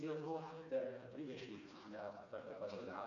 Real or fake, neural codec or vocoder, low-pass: fake; codec, 16 kHz, 2 kbps, FreqCodec, smaller model; 7.2 kHz